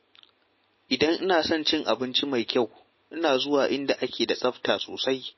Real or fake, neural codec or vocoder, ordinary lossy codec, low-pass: real; none; MP3, 24 kbps; 7.2 kHz